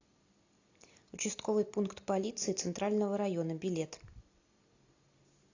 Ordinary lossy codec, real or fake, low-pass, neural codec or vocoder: AAC, 48 kbps; real; 7.2 kHz; none